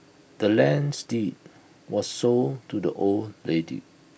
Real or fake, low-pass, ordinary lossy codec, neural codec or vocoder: real; none; none; none